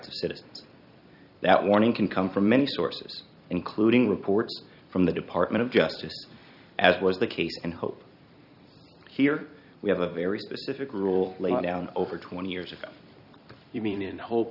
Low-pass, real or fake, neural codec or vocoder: 5.4 kHz; fake; vocoder, 44.1 kHz, 128 mel bands every 256 samples, BigVGAN v2